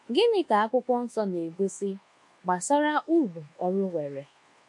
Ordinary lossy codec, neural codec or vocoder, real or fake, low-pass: MP3, 64 kbps; codec, 24 kHz, 1.2 kbps, DualCodec; fake; 10.8 kHz